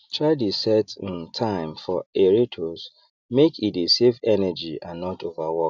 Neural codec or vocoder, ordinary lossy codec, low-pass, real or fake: none; none; 7.2 kHz; real